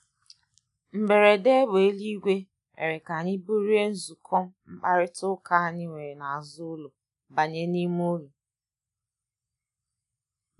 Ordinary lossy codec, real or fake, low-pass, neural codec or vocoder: none; real; 10.8 kHz; none